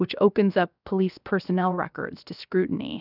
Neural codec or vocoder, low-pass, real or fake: codec, 16 kHz, 0.7 kbps, FocalCodec; 5.4 kHz; fake